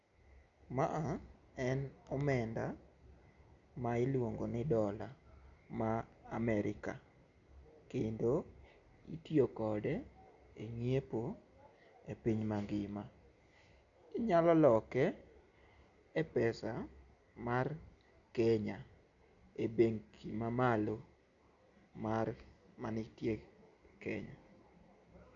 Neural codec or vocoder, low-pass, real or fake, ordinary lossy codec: none; 7.2 kHz; real; none